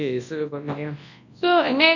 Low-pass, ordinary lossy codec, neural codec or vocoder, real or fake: 7.2 kHz; none; codec, 24 kHz, 0.9 kbps, WavTokenizer, large speech release; fake